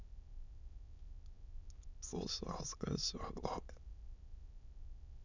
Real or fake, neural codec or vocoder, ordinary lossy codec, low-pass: fake; autoencoder, 22.05 kHz, a latent of 192 numbers a frame, VITS, trained on many speakers; none; 7.2 kHz